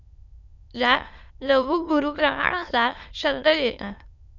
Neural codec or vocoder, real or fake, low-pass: autoencoder, 22.05 kHz, a latent of 192 numbers a frame, VITS, trained on many speakers; fake; 7.2 kHz